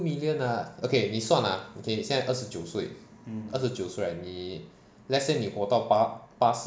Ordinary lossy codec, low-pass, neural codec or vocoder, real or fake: none; none; none; real